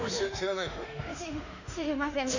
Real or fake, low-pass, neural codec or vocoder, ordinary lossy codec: fake; 7.2 kHz; autoencoder, 48 kHz, 32 numbers a frame, DAC-VAE, trained on Japanese speech; none